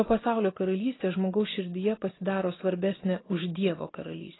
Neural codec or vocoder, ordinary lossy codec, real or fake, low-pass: none; AAC, 16 kbps; real; 7.2 kHz